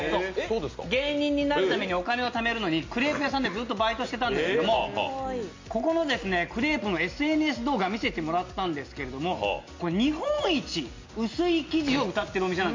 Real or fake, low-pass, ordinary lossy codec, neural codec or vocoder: real; 7.2 kHz; MP3, 48 kbps; none